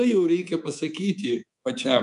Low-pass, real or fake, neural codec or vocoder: 10.8 kHz; fake; codec, 24 kHz, 3.1 kbps, DualCodec